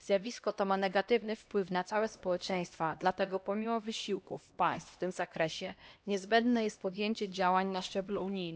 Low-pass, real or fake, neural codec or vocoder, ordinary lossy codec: none; fake; codec, 16 kHz, 1 kbps, X-Codec, HuBERT features, trained on LibriSpeech; none